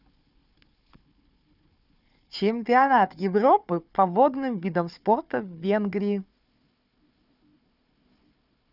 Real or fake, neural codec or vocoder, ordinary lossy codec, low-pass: fake; codec, 16 kHz, 4 kbps, FunCodec, trained on Chinese and English, 50 frames a second; none; 5.4 kHz